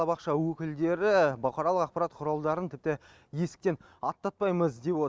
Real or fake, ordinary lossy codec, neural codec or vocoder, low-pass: real; none; none; none